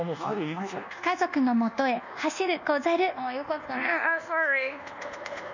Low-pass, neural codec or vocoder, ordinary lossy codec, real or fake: 7.2 kHz; codec, 24 kHz, 1.2 kbps, DualCodec; none; fake